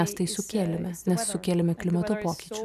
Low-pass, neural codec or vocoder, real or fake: 14.4 kHz; none; real